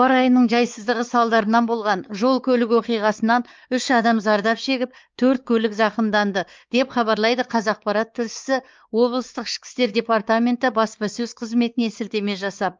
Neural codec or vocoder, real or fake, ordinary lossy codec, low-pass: codec, 16 kHz, 4 kbps, X-Codec, WavLM features, trained on Multilingual LibriSpeech; fake; Opus, 24 kbps; 7.2 kHz